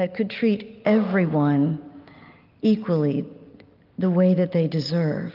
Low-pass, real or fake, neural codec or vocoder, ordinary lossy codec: 5.4 kHz; real; none; Opus, 24 kbps